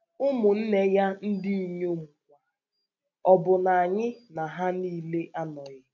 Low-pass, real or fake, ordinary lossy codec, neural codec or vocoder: 7.2 kHz; real; none; none